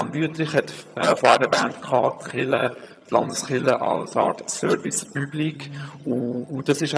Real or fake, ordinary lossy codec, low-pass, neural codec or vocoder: fake; none; none; vocoder, 22.05 kHz, 80 mel bands, HiFi-GAN